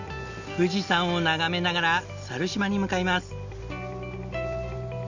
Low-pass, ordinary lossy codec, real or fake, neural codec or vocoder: 7.2 kHz; Opus, 64 kbps; real; none